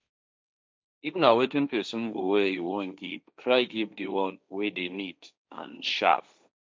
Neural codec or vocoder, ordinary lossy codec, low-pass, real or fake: codec, 16 kHz, 1.1 kbps, Voila-Tokenizer; none; none; fake